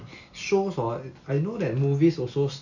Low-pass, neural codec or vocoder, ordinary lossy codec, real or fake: 7.2 kHz; none; none; real